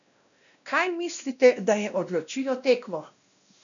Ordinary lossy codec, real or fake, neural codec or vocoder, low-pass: none; fake; codec, 16 kHz, 1 kbps, X-Codec, WavLM features, trained on Multilingual LibriSpeech; 7.2 kHz